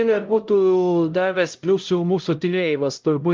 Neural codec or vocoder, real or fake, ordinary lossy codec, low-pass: codec, 16 kHz, 0.5 kbps, X-Codec, HuBERT features, trained on LibriSpeech; fake; Opus, 24 kbps; 7.2 kHz